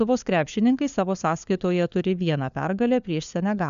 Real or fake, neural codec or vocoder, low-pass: fake; codec, 16 kHz, 4 kbps, FunCodec, trained on LibriTTS, 50 frames a second; 7.2 kHz